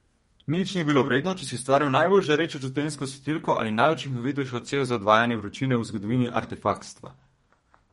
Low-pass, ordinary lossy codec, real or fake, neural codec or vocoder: 14.4 kHz; MP3, 48 kbps; fake; codec, 32 kHz, 1.9 kbps, SNAC